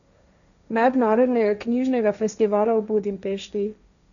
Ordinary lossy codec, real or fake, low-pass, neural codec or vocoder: none; fake; 7.2 kHz; codec, 16 kHz, 1.1 kbps, Voila-Tokenizer